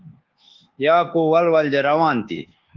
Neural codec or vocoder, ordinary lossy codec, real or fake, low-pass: codec, 24 kHz, 1.2 kbps, DualCodec; Opus, 16 kbps; fake; 7.2 kHz